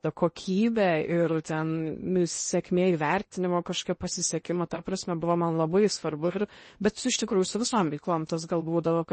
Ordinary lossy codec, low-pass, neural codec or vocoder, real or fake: MP3, 32 kbps; 10.8 kHz; codec, 16 kHz in and 24 kHz out, 0.8 kbps, FocalCodec, streaming, 65536 codes; fake